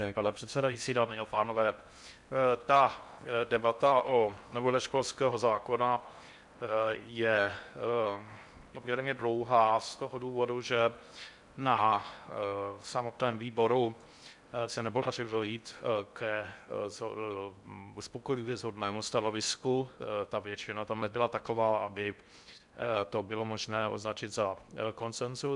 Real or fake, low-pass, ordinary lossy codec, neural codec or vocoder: fake; 10.8 kHz; MP3, 96 kbps; codec, 16 kHz in and 24 kHz out, 0.6 kbps, FocalCodec, streaming, 4096 codes